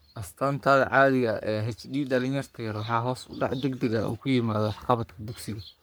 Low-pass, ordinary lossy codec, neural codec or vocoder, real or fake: none; none; codec, 44.1 kHz, 3.4 kbps, Pupu-Codec; fake